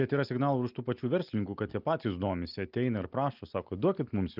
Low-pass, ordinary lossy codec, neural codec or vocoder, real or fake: 5.4 kHz; Opus, 32 kbps; none; real